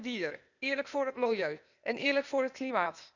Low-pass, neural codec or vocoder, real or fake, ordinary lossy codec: 7.2 kHz; codec, 16 kHz, 0.8 kbps, ZipCodec; fake; none